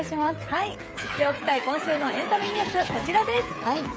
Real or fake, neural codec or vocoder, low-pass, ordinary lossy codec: fake; codec, 16 kHz, 8 kbps, FreqCodec, smaller model; none; none